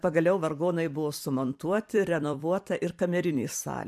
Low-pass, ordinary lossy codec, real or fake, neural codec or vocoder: 14.4 kHz; MP3, 96 kbps; fake; vocoder, 44.1 kHz, 128 mel bands every 256 samples, BigVGAN v2